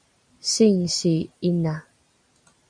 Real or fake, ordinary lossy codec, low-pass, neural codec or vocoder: real; MP3, 64 kbps; 9.9 kHz; none